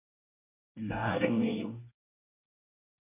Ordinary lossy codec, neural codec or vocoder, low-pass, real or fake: MP3, 24 kbps; codec, 24 kHz, 1 kbps, SNAC; 3.6 kHz; fake